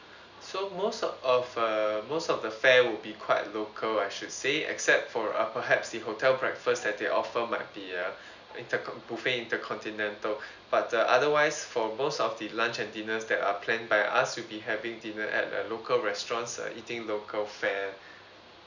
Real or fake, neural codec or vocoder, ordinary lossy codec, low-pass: real; none; none; 7.2 kHz